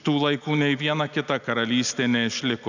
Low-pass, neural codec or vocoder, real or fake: 7.2 kHz; none; real